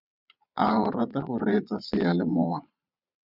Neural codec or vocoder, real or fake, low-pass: vocoder, 22.05 kHz, 80 mel bands, Vocos; fake; 5.4 kHz